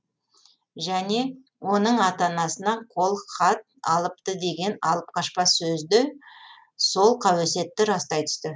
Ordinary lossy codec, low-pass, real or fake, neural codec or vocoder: none; none; real; none